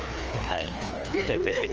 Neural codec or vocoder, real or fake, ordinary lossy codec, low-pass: codec, 16 kHz, 2 kbps, FreqCodec, larger model; fake; Opus, 24 kbps; 7.2 kHz